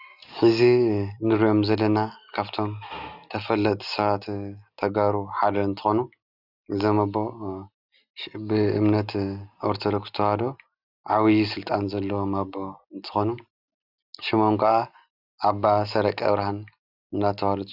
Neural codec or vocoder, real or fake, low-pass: none; real; 5.4 kHz